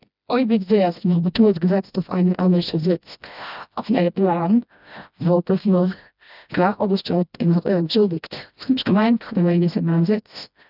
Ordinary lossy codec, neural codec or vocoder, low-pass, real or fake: none; codec, 16 kHz, 1 kbps, FreqCodec, smaller model; 5.4 kHz; fake